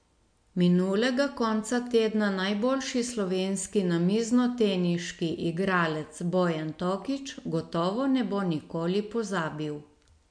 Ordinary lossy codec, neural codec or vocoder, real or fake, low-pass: MP3, 64 kbps; none; real; 9.9 kHz